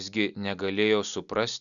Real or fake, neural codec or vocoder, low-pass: real; none; 7.2 kHz